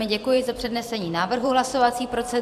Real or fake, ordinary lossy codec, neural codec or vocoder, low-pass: fake; AAC, 96 kbps; vocoder, 44.1 kHz, 128 mel bands every 512 samples, BigVGAN v2; 14.4 kHz